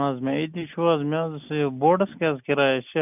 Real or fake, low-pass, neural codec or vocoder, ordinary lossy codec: real; 3.6 kHz; none; none